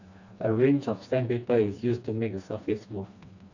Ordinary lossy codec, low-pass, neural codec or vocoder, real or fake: none; 7.2 kHz; codec, 16 kHz, 2 kbps, FreqCodec, smaller model; fake